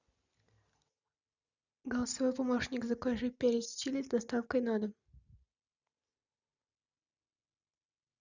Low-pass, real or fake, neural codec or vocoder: 7.2 kHz; real; none